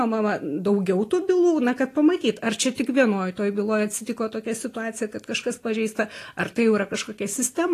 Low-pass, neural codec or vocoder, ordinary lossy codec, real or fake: 14.4 kHz; none; AAC, 48 kbps; real